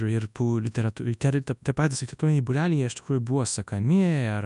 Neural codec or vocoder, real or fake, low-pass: codec, 24 kHz, 0.9 kbps, WavTokenizer, large speech release; fake; 10.8 kHz